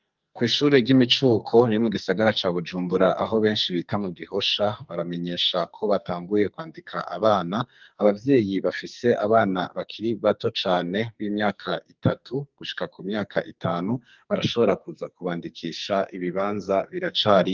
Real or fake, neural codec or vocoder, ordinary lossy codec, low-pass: fake; codec, 44.1 kHz, 2.6 kbps, SNAC; Opus, 32 kbps; 7.2 kHz